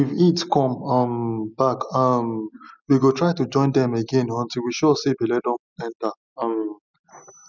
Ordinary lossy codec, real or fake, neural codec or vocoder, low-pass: none; real; none; 7.2 kHz